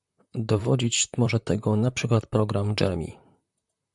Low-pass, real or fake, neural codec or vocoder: 10.8 kHz; fake; vocoder, 44.1 kHz, 128 mel bands, Pupu-Vocoder